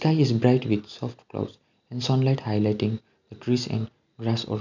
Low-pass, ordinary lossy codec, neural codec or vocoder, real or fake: 7.2 kHz; none; none; real